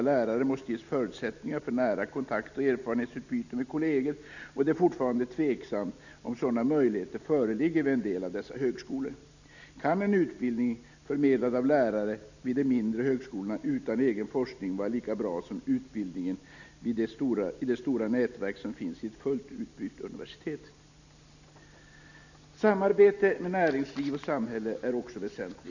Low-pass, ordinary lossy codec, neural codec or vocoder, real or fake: 7.2 kHz; none; none; real